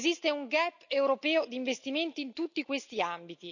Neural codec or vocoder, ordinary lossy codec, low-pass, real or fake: none; none; 7.2 kHz; real